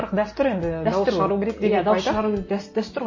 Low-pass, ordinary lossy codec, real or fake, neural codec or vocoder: 7.2 kHz; MP3, 32 kbps; real; none